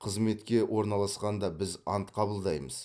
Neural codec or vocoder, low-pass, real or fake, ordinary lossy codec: none; none; real; none